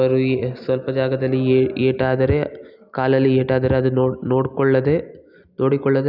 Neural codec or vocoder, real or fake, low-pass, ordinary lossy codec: none; real; 5.4 kHz; none